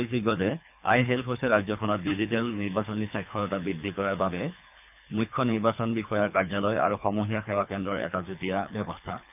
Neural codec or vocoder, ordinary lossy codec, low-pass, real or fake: codec, 24 kHz, 3 kbps, HILCodec; none; 3.6 kHz; fake